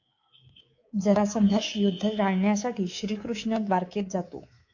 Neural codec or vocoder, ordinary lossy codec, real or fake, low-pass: codec, 24 kHz, 3.1 kbps, DualCodec; AAC, 48 kbps; fake; 7.2 kHz